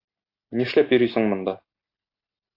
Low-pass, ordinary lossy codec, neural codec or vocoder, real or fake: 5.4 kHz; AAC, 32 kbps; none; real